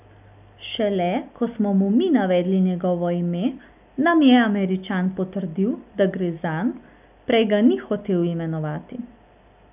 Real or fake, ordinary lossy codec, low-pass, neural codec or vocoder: real; none; 3.6 kHz; none